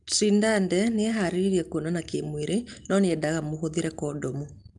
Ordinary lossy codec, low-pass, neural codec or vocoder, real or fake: Opus, 32 kbps; 10.8 kHz; none; real